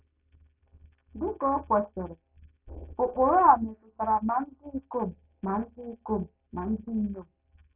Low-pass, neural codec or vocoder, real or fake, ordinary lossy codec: 3.6 kHz; none; real; none